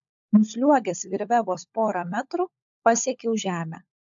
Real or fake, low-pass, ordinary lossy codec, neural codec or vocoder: fake; 7.2 kHz; AAC, 64 kbps; codec, 16 kHz, 16 kbps, FunCodec, trained on LibriTTS, 50 frames a second